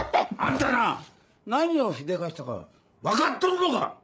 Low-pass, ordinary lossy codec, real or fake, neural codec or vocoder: none; none; fake; codec, 16 kHz, 8 kbps, FreqCodec, larger model